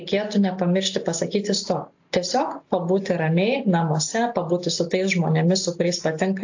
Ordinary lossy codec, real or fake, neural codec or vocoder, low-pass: AAC, 48 kbps; real; none; 7.2 kHz